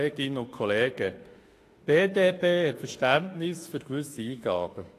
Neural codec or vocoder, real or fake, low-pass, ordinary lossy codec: autoencoder, 48 kHz, 32 numbers a frame, DAC-VAE, trained on Japanese speech; fake; 14.4 kHz; AAC, 48 kbps